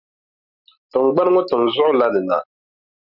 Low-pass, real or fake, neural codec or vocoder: 5.4 kHz; real; none